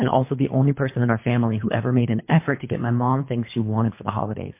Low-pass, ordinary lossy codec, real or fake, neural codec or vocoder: 3.6 kHz; MP3, 24 kbps; fake; codec, 24 kHz, 3 kbps, HILCodec